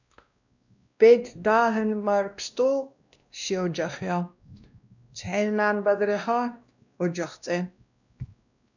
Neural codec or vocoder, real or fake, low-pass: codec, 16 kHz, 1 kbps, X-Codec, WavLM features, trained on Multilingual LibriSpeech; fake; 7.2 kHz